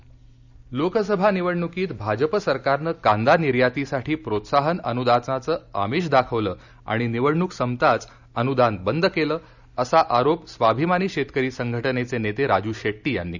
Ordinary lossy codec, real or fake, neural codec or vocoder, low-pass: none; real; none; 7.2 kHz